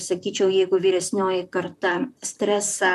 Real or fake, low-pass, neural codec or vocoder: fake; 14.4 kHz; vocoder, 44.1 kHz, 128 mel bands, Pupu-Vocoder